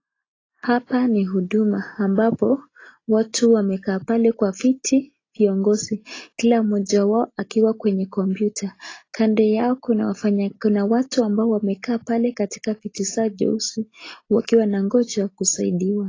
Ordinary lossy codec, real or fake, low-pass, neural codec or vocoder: AAC, 32 kbps; real; 7.2 kHz; none